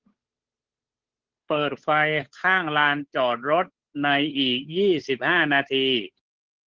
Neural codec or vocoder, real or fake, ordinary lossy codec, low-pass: codec, 16 kHz, 8 kbps, FunCodec, trained on Chinese and English, 25 frames a second; fake; Opus, 16 kbps; 7.2 kHz